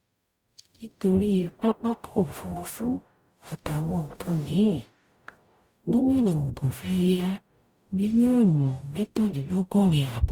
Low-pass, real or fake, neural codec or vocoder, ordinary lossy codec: 19.8 kHz; fake; codec, 44.1 kHz, 0.9 kbps, DAC; Opus, 64 kbps